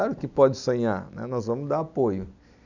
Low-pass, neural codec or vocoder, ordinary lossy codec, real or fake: 7.2 kHz; none; none; real